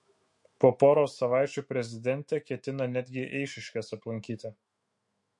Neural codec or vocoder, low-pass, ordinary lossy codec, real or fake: autoencoder, 48 kHz, 128 numbers a frame, DAC-VAE, trained on Japanese speech; 10.8 kHz; MP3, 48 kbps; fake